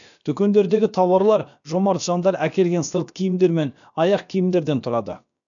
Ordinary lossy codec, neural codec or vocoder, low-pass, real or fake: none; codec, 16 kHz, about 1 kbps, DyCAST, with the encoder's durations; 7.2 kHz; fake